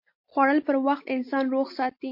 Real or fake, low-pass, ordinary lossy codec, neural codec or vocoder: real; 5.4 kHz; MP3, 32 kbps; none